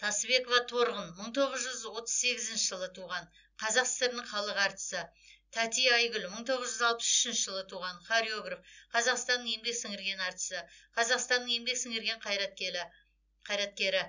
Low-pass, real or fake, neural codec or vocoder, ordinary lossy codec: 7.2 kHz; real; none; none